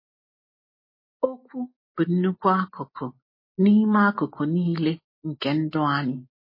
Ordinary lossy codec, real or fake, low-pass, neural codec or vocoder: MP3, 24 kbps; real; 5.4 kHz; none